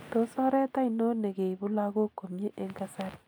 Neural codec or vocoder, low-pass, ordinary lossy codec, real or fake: none; none; none; real